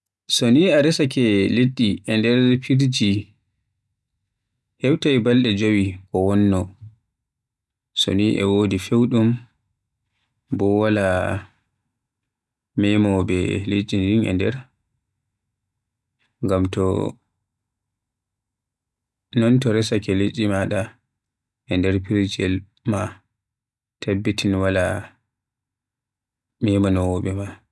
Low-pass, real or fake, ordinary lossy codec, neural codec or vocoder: none; real; none; none